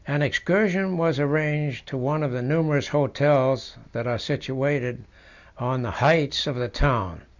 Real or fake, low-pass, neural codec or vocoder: real; 7.2 kHz; none